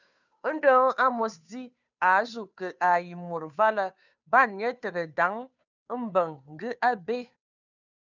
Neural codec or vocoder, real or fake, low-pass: codec, 16 kHz, 2 kbps, FunCodec, trained on Chinese and English, 25 frames a second; fake; 7.2 kHz